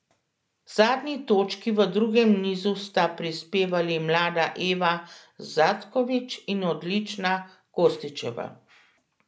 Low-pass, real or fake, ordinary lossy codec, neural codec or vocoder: none; real; none; none